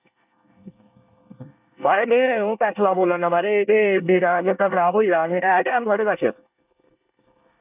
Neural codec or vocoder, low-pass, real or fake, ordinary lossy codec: codec, 24 kHz, 1 kbps, SNAC; 3.6 kHz; fake; none